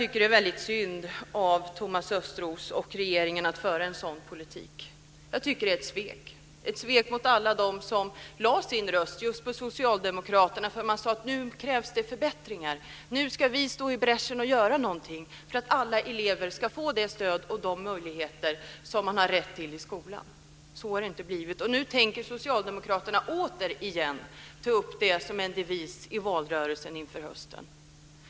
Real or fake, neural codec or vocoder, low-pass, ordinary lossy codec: real; none; none; none